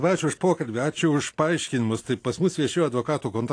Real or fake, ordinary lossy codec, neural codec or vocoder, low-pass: real; AAC, 48 kbps; none; 9.9 kHz